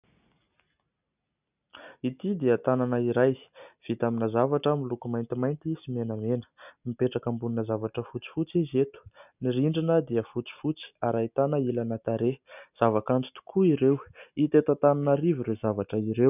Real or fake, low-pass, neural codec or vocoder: real; 3.6 kHz; none